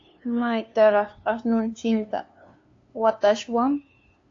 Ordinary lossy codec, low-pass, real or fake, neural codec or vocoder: AAC, 48 kbps; 7.2 kHz; fake; codec, 16 kHz, 2 kbps, FunCodec, trained on LibriTTS, 25 frames a second